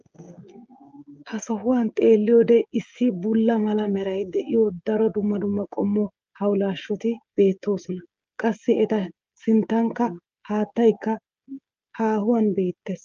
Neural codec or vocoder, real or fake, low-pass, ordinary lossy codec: codec, 16 kHz, 16 kbps, FreqCodec, smaller model; fake; 7.2 kHz; Opus, 24 kbps